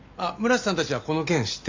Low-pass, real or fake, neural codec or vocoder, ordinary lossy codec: 7.2 kHz; real; none; MP3, 64 kbps